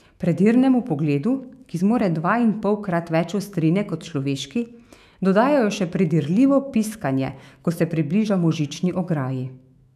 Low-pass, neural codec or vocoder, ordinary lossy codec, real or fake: 14.4 kHz; autoencoder, 48 kHz, 128 numbers a frame, DAC-VAE, trained on Japanese speech; none; fake